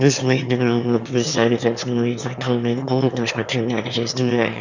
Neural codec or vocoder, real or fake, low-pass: autoencoder, 22.05 kHz, a latent of 192 numbers a frame, VITS, trained on one speaker; fake; 7.2 kHz